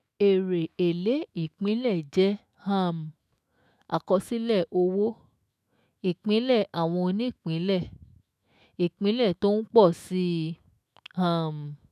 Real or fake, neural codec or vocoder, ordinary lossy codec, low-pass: fake; autoencoder, 48 kHz, 128 numbers a frame, DAC-VAE, trained on Japanese speech; none; 14.4 kHz